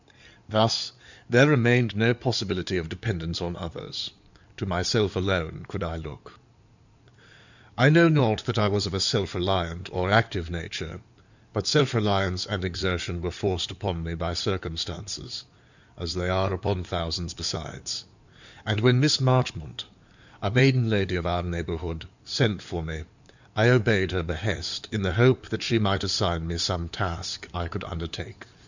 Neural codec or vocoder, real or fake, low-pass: codec, 16 kHz in and 24 kHz out, 2.2 kbps, FireRedTTS-2 codec; fake; 7.2 kHz